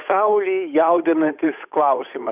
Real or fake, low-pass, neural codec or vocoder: fake; 3.6 kHz; codec, 16 kHz, 8 kbps, FunCodec, trained on Chinese and English, 25 frames a second